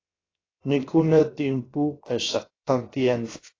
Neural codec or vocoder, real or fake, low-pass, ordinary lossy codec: codec, 16 kHz, 0.7 kbps, FocalCodec; fake; 7.2 kHz; AAC, 32 kbps